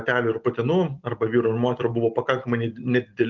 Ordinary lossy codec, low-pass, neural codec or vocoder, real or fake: Opus, 32 kbps; 7.2 kHz; none; real